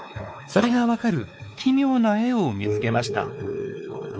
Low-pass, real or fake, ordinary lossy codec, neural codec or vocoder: none; fake; none; codec, 16 kHz, 4 kbps, X-Codec, WavLM features, trained on Multilingual LibriSpeech